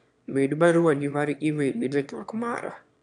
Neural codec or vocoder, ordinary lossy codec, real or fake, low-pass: autoencoder, 22.05 kHz, a latent of 192 numbers a frame, VITS, trained on one speaker; none; fake; 9.9 kHz